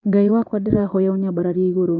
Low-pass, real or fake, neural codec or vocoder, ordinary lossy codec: 7.2 kHz; fake; vocoder, 22.05 kHz, 80 mel bands, Vocos; none